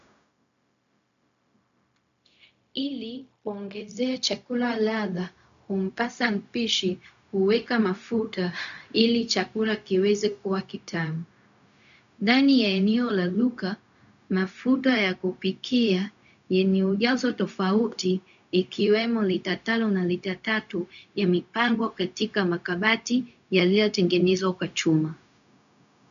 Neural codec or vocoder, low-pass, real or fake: codec, 16 kHz, 0.4 kbps, LongCat-Audio-Codec; 7.2 kHz; fake